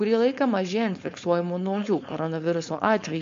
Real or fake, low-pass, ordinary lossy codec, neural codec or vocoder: fake; 7.2 kHz; MP3, 48 kbps; codec, 16 kHz, 4.8 kbps, FACodec